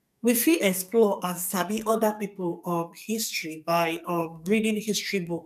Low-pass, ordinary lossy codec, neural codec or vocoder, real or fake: 14.4 kHz; none; codec, 44.1 kHz, 2.6 kbps, SNAC; fake